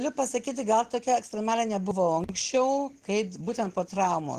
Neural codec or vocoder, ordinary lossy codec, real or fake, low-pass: none; Opus, 16 kbps; real; 14.4 kHz